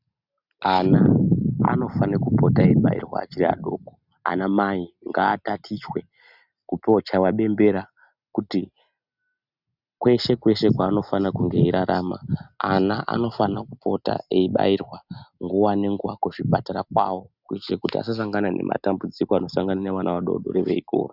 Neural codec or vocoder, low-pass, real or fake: none; 5.4 kHz; real